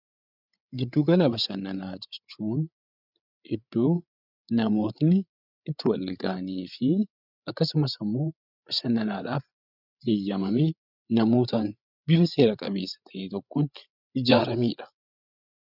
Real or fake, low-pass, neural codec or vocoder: fake; 5.4 kHz; codec, 16 kHz, 8 kbps, FreqCodec, larger model